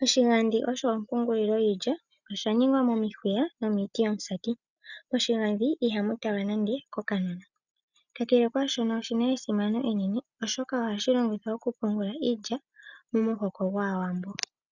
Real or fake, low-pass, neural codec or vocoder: real; 7.2 kHz; none